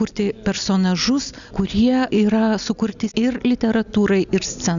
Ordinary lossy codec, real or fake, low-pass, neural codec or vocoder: MP3, 96 kbps; real; 7.2 kHz; none